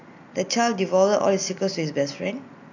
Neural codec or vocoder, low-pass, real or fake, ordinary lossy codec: none; 7.2 kHz; real; none